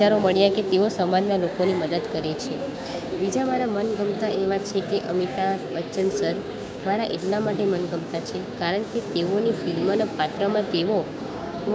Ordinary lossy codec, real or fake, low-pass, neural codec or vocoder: none; fake; none; codec, 16 kHz, 6 kbps, DAC